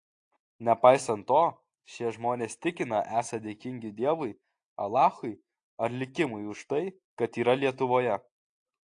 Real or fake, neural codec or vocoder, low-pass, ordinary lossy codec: real; none; 9.9 kHz; AAC, 48 kbps